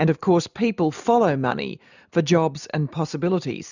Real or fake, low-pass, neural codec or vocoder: real; 7.2 kHz; none